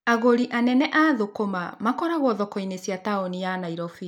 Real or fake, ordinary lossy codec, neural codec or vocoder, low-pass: real; none; none; 19.8 kHz